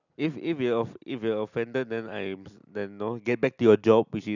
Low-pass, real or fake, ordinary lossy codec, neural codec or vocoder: 7.2 kHz; real; none; none